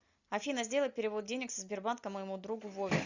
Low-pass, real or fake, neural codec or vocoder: 7.2 kHz; real; none